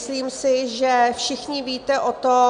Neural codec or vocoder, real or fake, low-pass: none; real; 9.9 kHz